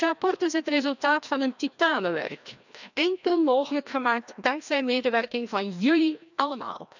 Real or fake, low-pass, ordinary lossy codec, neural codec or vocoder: fake; 7.2 kHz; none; codec, 16 kHz, 1 kbps, FreqCodec, larger model